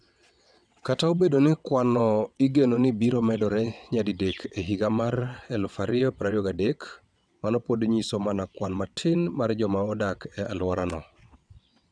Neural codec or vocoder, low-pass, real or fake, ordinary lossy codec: vocoder, 22.05 kHz, 80 mel bands, WaveNeXt; 9.9 kHz; fake; none